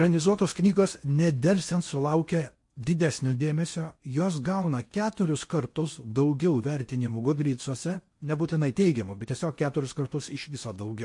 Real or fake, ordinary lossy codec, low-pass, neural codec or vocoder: fake; MP3, 48 kbps; 10.8 kHz; codec, 16 kHz in and 24 kHz out, 0.8 kbps, FocalCodec, streaming, 65536 codes